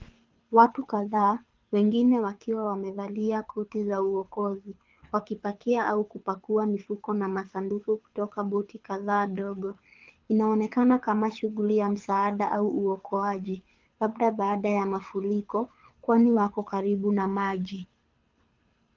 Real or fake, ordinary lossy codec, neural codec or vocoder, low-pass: fake; Opus, 32 kbps; codec, 24 kHz, 6 kbps, HILCodec; 7.2 kHz